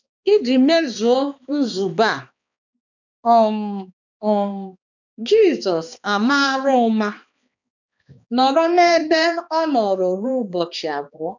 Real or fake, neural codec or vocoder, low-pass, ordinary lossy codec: fake; codec, 16 kHz, 2 kbps, X-Codec, HuBERT features, trained on balanced general audio; 7.2 kHz; none